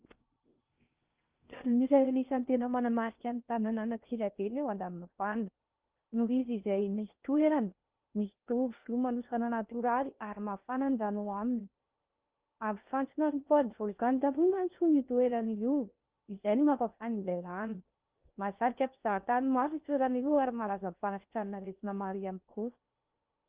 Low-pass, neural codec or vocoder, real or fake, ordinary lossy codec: 3.6 kHz; codec, 16 kHz in and 24 kHz out, 0.6 kbps, FocalCodec, streaming, 4096 codes; fake; Opus, 32 kbps